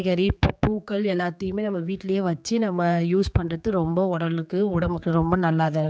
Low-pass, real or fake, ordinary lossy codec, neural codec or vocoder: none; fake; none; codec, 16 kHz, 4 kbps, X-Codec, HuBERT features, trained on general audio